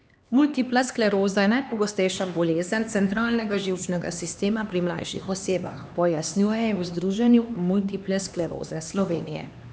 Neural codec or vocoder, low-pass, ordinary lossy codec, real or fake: codec, 16 kHz, 2 kbps, X-Codec, HuBERT features, trained on LibriSpeech; none; none; fake